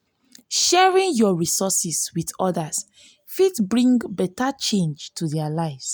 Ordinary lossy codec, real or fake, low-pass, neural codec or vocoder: none; real; none; none